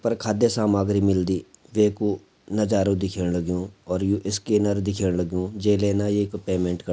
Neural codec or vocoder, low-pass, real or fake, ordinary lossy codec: none; none; real; none